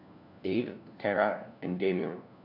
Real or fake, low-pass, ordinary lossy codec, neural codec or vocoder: fake; 5.4 kHz; none; codec, 16 kHz, 1 kbps, FunCodec, trained on LibriTTS, 50 frames a second